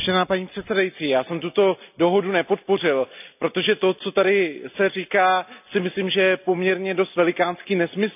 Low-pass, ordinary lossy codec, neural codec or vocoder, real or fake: 3.6 kHz; none; none; real